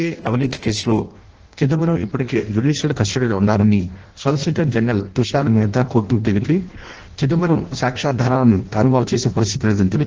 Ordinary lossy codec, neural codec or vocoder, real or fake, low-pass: Opus, 16 kbps; codec, 16 kHz in and 24 kHz out, 0.6 kbps, FireRedTTS-2 codec; fake; 7.2 kHz